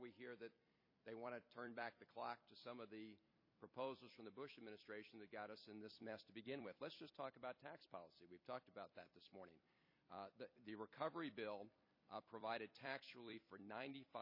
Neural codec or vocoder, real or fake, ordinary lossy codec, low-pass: vocoder, 44.1 kHz, 128 mel bands every 256 samples, BigVGAN v2; fake; MP3, 24 kbps; 5.4 kHz